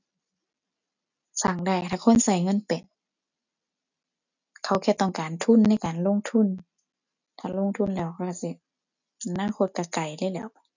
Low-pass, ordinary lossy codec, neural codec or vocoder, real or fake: 7.2 kHz; none; none; real